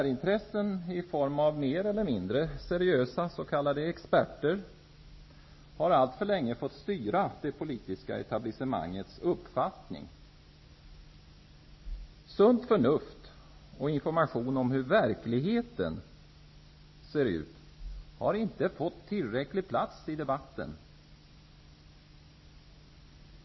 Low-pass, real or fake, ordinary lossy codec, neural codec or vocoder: 7.2 kHz; real; MP3, 24 kbps; none